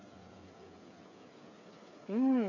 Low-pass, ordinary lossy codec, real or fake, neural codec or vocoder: 7.2 kHz; none; fake; codec, 16 kHz, 8 kbps, FreqCodec, smaller model